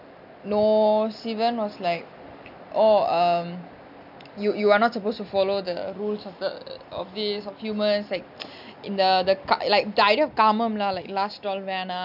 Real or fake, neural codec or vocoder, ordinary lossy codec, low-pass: real; none; none; 5.4 kHz